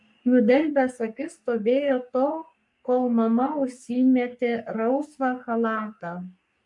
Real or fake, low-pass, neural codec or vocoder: fake; 10.8 kHz; codec, 44.1 kHz, 3.4 kbps, Pupu-Codec